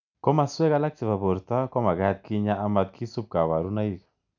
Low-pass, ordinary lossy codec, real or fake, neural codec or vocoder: 7.2 kHz; none; real; none